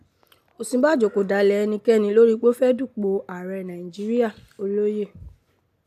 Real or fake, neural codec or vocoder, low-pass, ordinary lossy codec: real; none; 14.4 kHz; AAC, 96 kbps